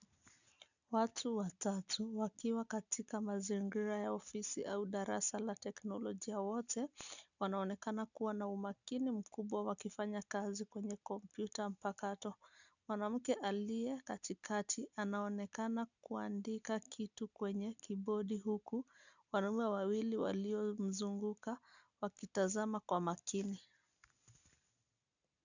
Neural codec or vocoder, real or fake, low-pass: none; real; 7.2 kHz